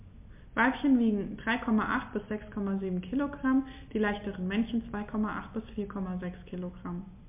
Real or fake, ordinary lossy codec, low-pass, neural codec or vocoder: real; MP3, 32 kbps; 3.6 kHz; none